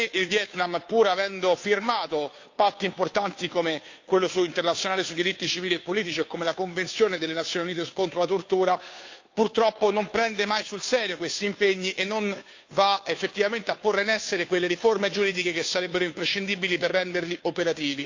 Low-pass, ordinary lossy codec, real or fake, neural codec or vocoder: 7.2 kHz; AAC, 48 kbps; fake; codec, 16 kHz, 2 kbps, FunCodec, trained on Chinese and English, 25 frames a second